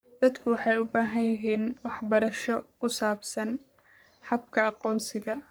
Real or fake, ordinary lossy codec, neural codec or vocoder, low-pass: fake; none; codec, 44.1 kHz, 3.4 kbps, Pupu-Codec; none